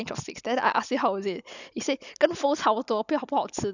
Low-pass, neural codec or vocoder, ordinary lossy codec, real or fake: 7.2 kHz; none; none; real